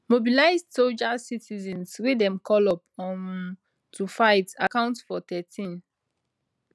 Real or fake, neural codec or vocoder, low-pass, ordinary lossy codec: real; none; none; none